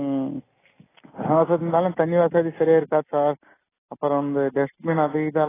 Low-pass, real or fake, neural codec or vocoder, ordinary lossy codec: 3.6 kHz; real; none; AAC, 16 kbps